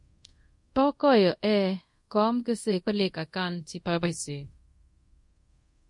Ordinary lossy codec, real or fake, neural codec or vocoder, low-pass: MP3, 48 kbps; fake; codec, 24 kHz, 0.5 kbps, DualCodec; 10.8 kHz